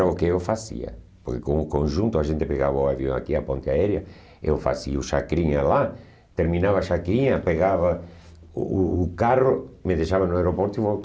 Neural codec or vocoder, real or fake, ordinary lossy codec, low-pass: none; real; none; none